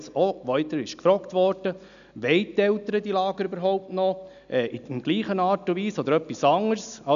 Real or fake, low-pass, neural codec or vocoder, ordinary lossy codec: real; 7.2 kHz; none; none